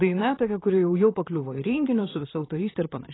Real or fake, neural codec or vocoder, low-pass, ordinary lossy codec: fake; vocoder, 44.1 kHz, 128 mel bands, Pupu-Vocoder; 7.2 kHz; AAC, 16 kbps